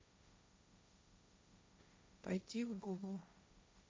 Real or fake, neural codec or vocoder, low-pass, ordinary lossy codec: fake; codec, 16 kHz, 1.1 kbps, Voila-Tokenizer; none; none